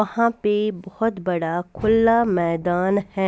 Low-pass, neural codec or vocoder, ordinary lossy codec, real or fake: none; none; none; real